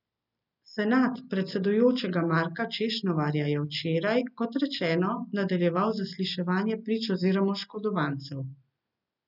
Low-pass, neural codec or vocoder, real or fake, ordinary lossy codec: 5.4 kHz; none; real; none